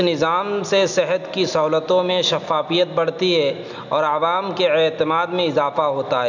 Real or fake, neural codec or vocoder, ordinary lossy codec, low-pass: real; none; none; 7.2 kHz